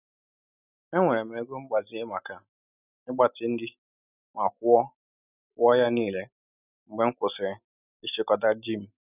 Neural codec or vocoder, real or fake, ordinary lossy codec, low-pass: none; real; none; 3.6 kHz